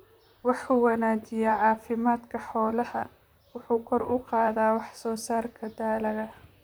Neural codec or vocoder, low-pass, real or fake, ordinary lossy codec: vocoder, 44.1 kHz, 128 mel bands, Pupu-Vocoder; none; fake; none